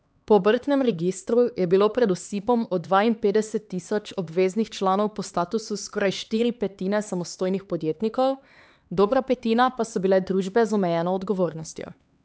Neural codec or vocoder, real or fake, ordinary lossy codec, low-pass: codec, 16 kHz, 4 kbps, X-Codec, HuBERT features, trained on LibriSpeech; fake; none; none